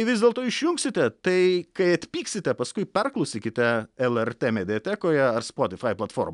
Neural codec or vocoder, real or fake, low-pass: none; real; 10.8 kHz